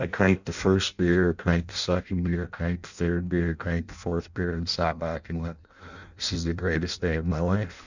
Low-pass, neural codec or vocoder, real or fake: 7.2 kHz; codec, 16 kHz in and 24 kHz out, 0.6 kbps, FireRedTTS-2 codec; fake